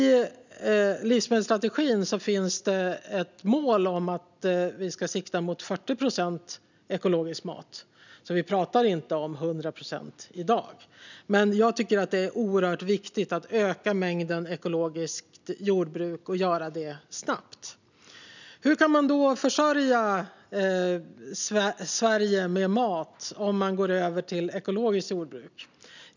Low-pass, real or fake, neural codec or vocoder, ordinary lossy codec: 7.2 kHz; real; none; none